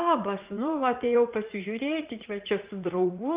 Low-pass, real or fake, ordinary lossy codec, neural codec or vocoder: 3.6 kHz; fake; Opus, 24 kbps; vocoder, 44.1 kHz, 80 mel bands, Vocos